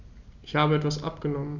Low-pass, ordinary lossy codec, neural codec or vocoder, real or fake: 7.2 kHz; none; none; real